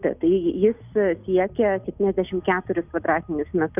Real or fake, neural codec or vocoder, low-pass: real; none; 3.6 kHz